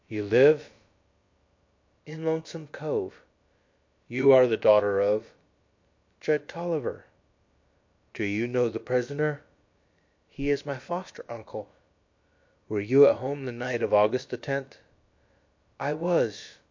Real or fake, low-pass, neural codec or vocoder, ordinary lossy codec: fake; 7.2 kHz; codec, 16 kHz, about 1 kbps, DyCAST, with the encoder's durations; MP3, 48 kbps